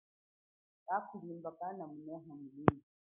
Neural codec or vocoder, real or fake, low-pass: none; real; 3.6 kHz